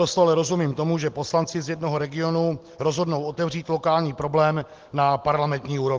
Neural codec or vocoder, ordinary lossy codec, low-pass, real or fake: none; Opus, 16 kbps; 7.2 kHz; real